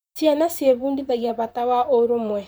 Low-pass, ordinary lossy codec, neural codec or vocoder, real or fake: none; none; vocoder, 44.1 kHz, 128 mel bands, Pupu-Vocoder; fake